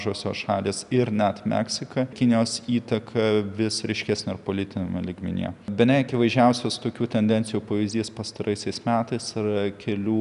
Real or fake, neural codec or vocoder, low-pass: real; none; 10.8 kHz